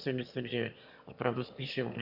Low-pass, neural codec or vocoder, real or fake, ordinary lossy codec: 5.4 kHz; autoencoder, 22.05 kHz, a latent of 192 numbers a frame, VITS, trained on one speaker; fake; AAC, 48 kbps